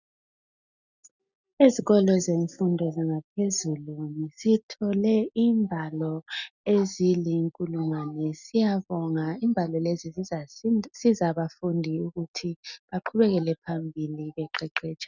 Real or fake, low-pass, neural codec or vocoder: real; 7.2 kHz; none